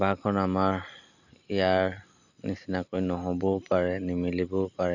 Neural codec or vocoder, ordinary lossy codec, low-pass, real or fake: none; none; 7.2 kHz; real